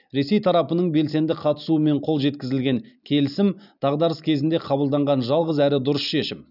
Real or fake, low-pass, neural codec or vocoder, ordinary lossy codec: real; 5.4 kHz; none; none